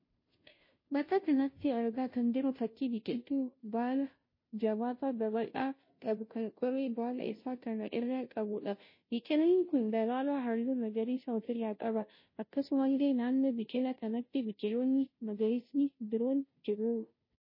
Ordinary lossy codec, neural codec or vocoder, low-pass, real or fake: MP3, 24 kbps; codec, 16 kHz, 0.5 kbps, FunCodec, trained on Chinese and English, 25 frames a second; 5.4 kHz; fake